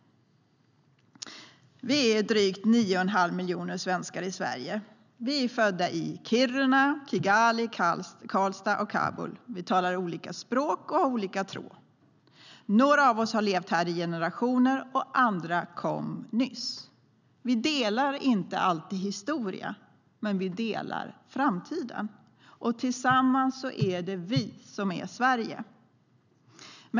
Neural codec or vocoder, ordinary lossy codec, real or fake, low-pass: none; none; real; 7.2 kHz